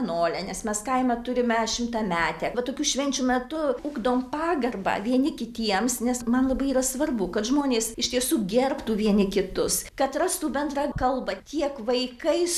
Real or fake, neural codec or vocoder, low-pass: real; none; 14.4 kHz